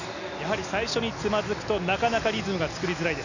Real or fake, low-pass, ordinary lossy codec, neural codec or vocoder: real; 7.2 kHz; none; none